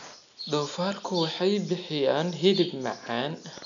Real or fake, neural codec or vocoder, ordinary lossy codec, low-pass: real; none; none; 7.2 kHz